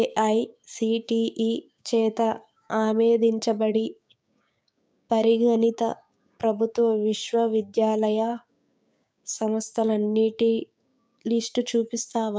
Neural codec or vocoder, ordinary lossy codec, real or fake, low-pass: codec, 16 kHz, 6 kbps, DAC; none; fake; none